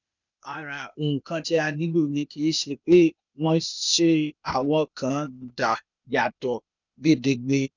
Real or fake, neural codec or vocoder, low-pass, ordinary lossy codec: fake; codec, 16 kHz, 0.8 kbps, ZipCodec; 7.2 kHz; none